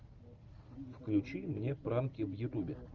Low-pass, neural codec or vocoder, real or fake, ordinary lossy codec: 7.2 kHz; none; real; Opus, 32 kbps